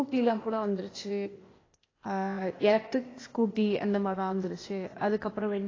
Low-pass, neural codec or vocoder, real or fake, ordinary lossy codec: 7.2 kHz; codec, 16 kHz, 0.8 kbps, ZipCodec; fake; AAC, 32 kbps